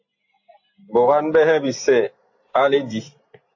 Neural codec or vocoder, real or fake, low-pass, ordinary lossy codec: none; real; 7.2 kHz; AAC, 48 kbps